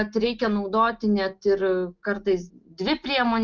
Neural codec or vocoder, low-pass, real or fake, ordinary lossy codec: none; 7.2 kHz; real; Opus, 24 kbps